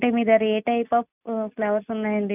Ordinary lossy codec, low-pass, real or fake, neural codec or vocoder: none; 3.6 kHz; real; none